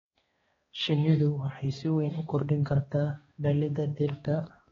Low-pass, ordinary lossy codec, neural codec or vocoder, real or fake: 7.2 kHz; AAC, 24 kbps; codec, 16 kHz, 2 kbps, X-Codec, HuBERT features, trained on balanced general audio; fake